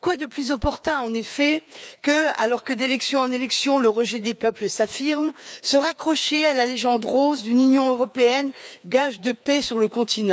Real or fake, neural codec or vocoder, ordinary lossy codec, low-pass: fake; codec, 16 kHz, 2 kbps, FreqCodec, larger model; none; none